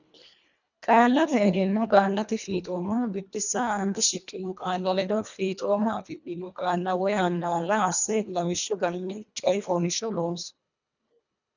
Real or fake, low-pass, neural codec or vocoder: fake; 7.2 kHz; codec, 24 kHz, 1.5 kbps, HILCodec